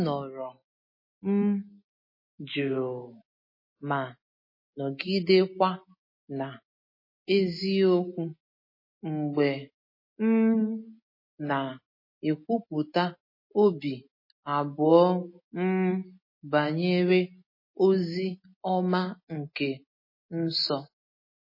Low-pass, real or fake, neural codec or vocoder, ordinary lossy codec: 5.4 kHz; real; none; MP3, 24 kbps